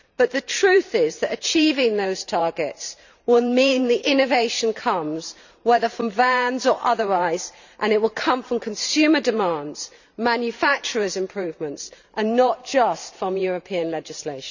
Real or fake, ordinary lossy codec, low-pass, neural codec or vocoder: fake; none; 7.2 kHz; vocoder, 44.1 kHz, 128 mel bands every 512 samples, BigVGAN v2